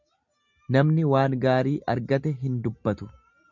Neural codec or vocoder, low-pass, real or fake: none; 7.2 kHz; real